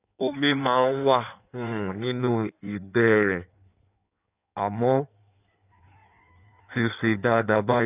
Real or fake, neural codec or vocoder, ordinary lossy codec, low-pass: fake; codec, 16 kHz in and 24 kHz out, 1.1 kbps, FireRedTTS-2 codec; none; 3.6 kHz